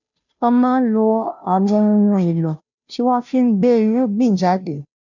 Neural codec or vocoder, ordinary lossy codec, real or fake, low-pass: codec, 16 kHz, 0.5 kbps, FunCodec, trained on Chinese and English, 25 frames a second; none; fake; 7.2 kHz